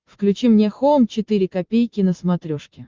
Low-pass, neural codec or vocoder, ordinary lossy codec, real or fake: 7.2 kHz; none; Opus, 24 kbps; real